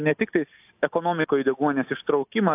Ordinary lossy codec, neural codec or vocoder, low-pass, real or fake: AAC, 32 kbps; none; 3.6 kHz; real